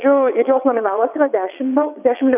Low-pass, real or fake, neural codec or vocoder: 3.6 kHz; fake; vocoder, 22.05 kHz, 80 mel bands, WaveNeXt